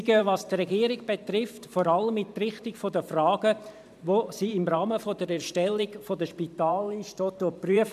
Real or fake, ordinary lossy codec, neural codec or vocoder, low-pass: fake; MP3, 96 kbps; vocoder, 44.1 kHz, 128 mel bands every 512 samples, BigVGAN v2; 14.4 kHz